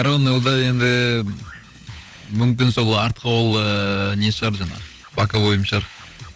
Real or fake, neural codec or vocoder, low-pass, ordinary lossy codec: fake; codec, 16 kHz, 16 kbps, FunCodec, trained on LibriTTS, 50 frames a second; none; none